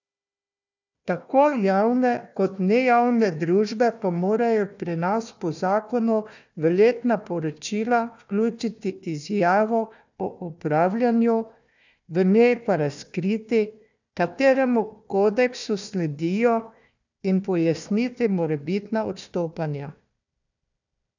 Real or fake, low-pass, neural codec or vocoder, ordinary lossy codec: fake; 7.2 kHz; codec, 16 kHz, 1 kbps, FunCodec, trained on Chinese and English, 50 frames a second; none